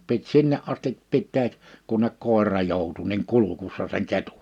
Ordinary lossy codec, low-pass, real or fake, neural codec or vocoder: none; 19.8 kHz; real; none